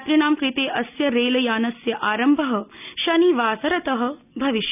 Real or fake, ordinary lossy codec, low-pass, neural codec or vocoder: real; none; 3.6 kHz; none